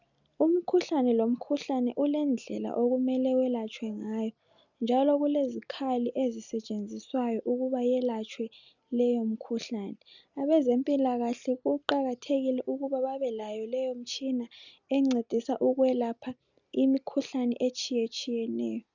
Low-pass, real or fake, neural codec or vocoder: 7.2 kHz; real; none